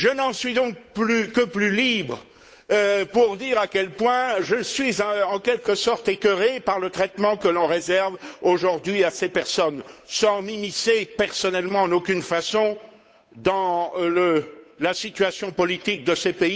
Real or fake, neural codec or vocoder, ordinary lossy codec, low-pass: fake; codec, 16 kHz, 8 kbps, FunCodec, trained on Chinese and English, 25 frames a second; none; none